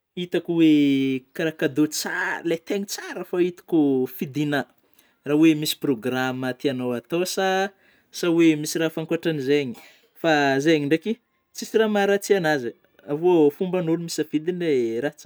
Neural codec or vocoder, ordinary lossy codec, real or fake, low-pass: none; none; real; none